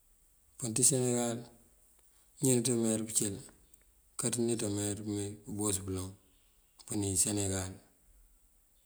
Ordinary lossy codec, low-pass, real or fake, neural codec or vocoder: none; none; real; none